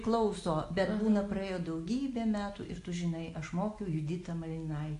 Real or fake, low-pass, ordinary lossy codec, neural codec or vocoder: real; 10.8 kHz; AAC, 48 kbps; none